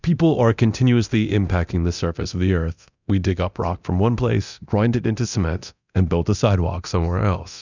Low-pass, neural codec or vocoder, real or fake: 7.2 kHz; codec, 24 kHz, 0.9 kbps, DualCodec; fake